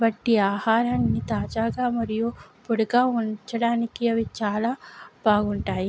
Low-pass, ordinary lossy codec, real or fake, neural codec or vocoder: none; none; real; none